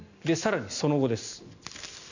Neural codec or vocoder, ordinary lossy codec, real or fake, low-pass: none; none; real; 7.2 kHz